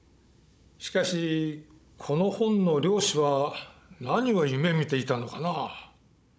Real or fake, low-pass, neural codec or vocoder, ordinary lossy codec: fake; none; codec, 16 kHz, 16 kbps, FunCodec, trained on Chinese and English, 50 frames a second; none